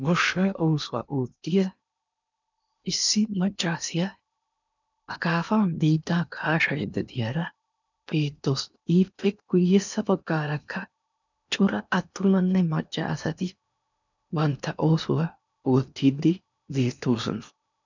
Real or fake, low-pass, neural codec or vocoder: fake; 7.2 kHz; codec, 16 kHz in and 24 kHz out, 0.8 kbps, FocalCodec, streaming, 65536 codes